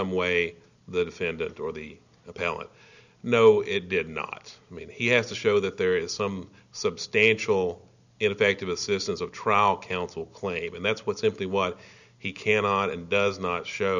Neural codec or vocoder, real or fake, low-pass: none; real; 7.2 kHz